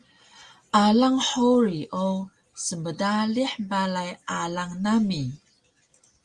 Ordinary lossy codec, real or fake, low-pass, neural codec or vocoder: Opus, 32 kbps; real; 10.8 kHz; none